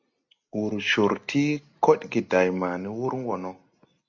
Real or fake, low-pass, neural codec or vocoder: real; 7.2 kHz; none